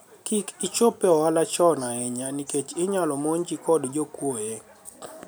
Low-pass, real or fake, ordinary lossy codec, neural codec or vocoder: none; real; none; none